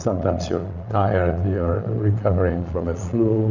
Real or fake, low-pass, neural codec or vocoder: fake; 7.2 kHz; vocoder, 22.05 kHz, 80 mel bands, Vocos